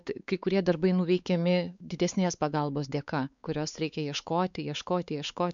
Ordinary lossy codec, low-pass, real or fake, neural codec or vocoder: MP3, 96 kbps; 7.2 kHz; fake; codec, 16 kHz, 4 kbps, X-Codec, WavLM features, trained on Multilingual LibriSpeech